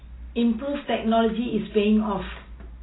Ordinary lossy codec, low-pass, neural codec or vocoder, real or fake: AAC, 16 kbps; 7.2 kHz; none; real